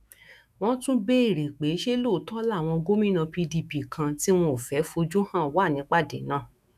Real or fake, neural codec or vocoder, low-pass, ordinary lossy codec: fake; autoencoder, 48 kHz, 128 numbers a frame, DAC-VAE, trained on Japanese speech; 14.4 kHz; none